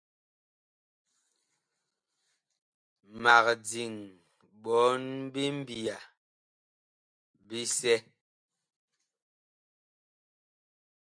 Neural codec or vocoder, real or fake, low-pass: none; real; 9.9 kHz